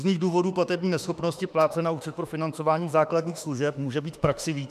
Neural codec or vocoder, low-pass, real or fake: autoencoder, 48 kHz, 32 numbers a frame, DAC-VAE, trained on Japanese speech; 14.4 kHz; fake